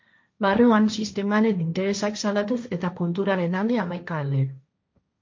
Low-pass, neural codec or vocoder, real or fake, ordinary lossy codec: 7.2 kHz; codec, 16 kHz, 1.1 kbps, Voila-Tokenizer; fake; MP3, 48 kbps